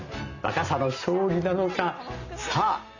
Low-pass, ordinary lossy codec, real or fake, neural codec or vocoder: 7.2 kHz; none; real; none